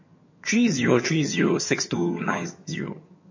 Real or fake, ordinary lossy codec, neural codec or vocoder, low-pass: fake; MP3, 32 kbps; vocoder, 22.05 kHz, 80 mel bands, HiFi-GAN; 7.2 kHz